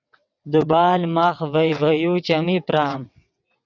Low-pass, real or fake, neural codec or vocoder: 7.2 kHz; fake; vocoder, 22.05 kHz, 80 mel bands, WaveNeXt